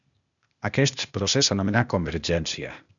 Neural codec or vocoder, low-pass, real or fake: codec, 16 kHz, 0.8 kbps, ZipCodec; 7.2 kHz; fake